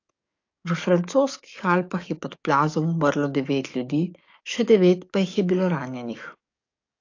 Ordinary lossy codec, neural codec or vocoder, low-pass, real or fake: AAC, 48 kbps; codec, 44.1 kHz, 7.8 kbps, DAC; 7.2 kHz; fake